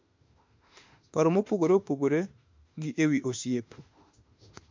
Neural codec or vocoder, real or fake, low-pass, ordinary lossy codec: autoencoder, 48 kHz, 32 numbers a frame, DAC-VAE, trained on Japanese speech; fake; 7.2 kHz; MP3, 48 kbps